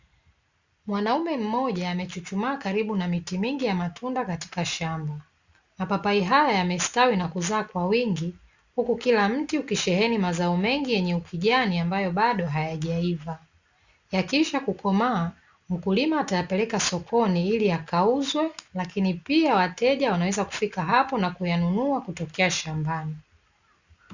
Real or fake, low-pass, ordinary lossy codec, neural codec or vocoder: real; 7.2 kHz; Opus, 64 kbps; none